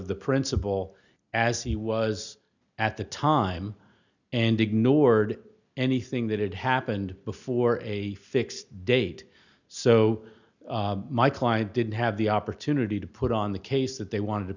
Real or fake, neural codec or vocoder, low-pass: real; none; 7.2 kHz